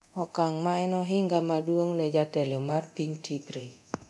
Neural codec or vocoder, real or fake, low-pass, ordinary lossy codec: codec, 24 kHz, 0.9 kbps, DualCodec; fake; none; none